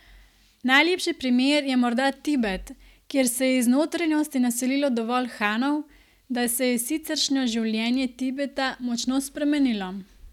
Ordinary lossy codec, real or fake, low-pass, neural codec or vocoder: none; real; 19.8 kHz; none